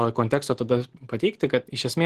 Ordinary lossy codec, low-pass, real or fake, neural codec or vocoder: Opus, 16 kbps; 14.4 kHz; real; none